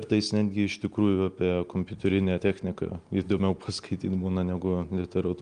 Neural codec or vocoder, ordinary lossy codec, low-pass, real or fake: none; Opus, 32 kbps; 9.9 kHz; real